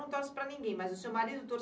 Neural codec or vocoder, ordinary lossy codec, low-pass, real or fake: none; none; none; real